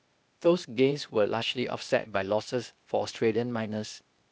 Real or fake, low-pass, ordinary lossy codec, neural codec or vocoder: fake; none; none; codec, 16 kHz, 0.8 kbps, ZipCodec